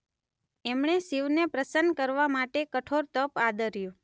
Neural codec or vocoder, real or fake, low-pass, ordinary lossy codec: none; real; none; none